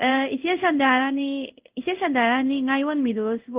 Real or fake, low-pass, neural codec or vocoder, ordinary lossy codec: fake; 3.6 kHz; codec, 16 kHz in and 24 kHz out, 1 kbps, XY-Tokenizer; Opus, 32 kbps